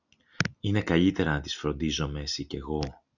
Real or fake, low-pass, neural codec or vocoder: real; 7.2 kHz; none